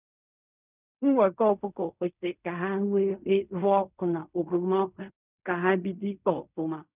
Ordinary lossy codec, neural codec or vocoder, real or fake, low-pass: none; codec, 16 kHz in and 24 kHz out, 0.4 kbps, LongCat-Audio-Codec, fine tuned four codebook decoder; fake; 3.6 kHz